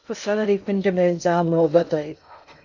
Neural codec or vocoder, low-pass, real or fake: codec, 16 kHz in and 24 kHz out, 0.6 kbps, FocalCodec, streaming, 2048 codes; 7.2 kHz; fake